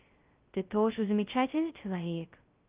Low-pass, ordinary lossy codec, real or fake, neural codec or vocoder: 3.6 kHz; Opus, 24 kbps; fake; codec, 16 kHz, 0.2 kbps, FocalCodec